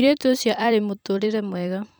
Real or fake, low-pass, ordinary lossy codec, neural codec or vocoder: fake; none; none; vocoder, 44.1 kHz, 128 mel bands every 256 samples, BigVGAN v2